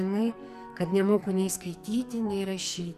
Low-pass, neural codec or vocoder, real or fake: 14.4 kHz; codec, 44.1 kHz, 2.6 kbps, SNAC; fake